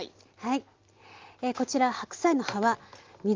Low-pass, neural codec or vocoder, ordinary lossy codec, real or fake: 7.2 kHz; none; Opus, 32 kbps; real